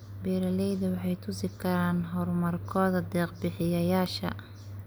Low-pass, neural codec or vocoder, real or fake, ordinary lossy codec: none; none; real; none